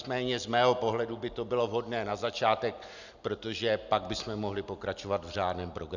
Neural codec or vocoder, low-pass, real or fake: none; 7.2 kHz; real